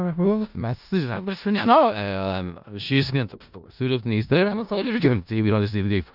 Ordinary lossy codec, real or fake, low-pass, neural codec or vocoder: none; fake; 5.4 kHz; codec, 16 kHz in and 24 kHz out, 0.4 kbps, LongCat-Audio-Codec, four codebook decoder